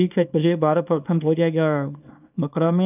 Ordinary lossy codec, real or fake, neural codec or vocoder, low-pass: none; fake; codec, 24 kHz, 0.9 kbps, WavTokenizer, small release; 3.6 kHz